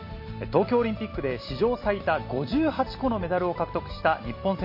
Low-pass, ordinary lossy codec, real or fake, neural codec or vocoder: 5.4 kHz; MP3, 32 kbps; real; none